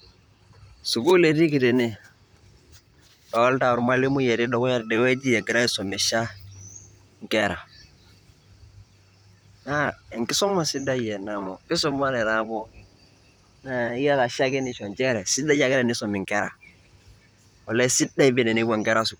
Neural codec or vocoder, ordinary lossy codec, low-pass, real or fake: vocoder, 44.1 kHz, 128 mel bands, Pupu-Vocoder; none; none; fake